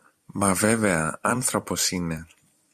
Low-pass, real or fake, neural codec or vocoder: 14.4 kHz; fake; vocoder, 44.1 kHz, 128 mel bands every 256 samples, BigVGAN v2